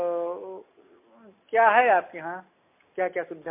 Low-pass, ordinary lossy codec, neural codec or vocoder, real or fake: 3.6 kHz; MP3, 24 kbps; none; real